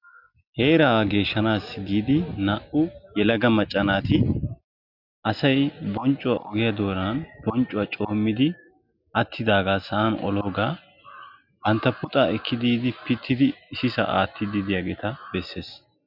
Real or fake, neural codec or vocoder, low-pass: real; none; 5.4 kHz